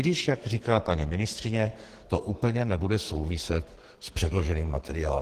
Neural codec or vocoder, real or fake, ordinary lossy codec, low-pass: codec, 44.1 kHz, 2.6 kbps, SNAC; fake; Opus, 16 kbps; 14.4 kHz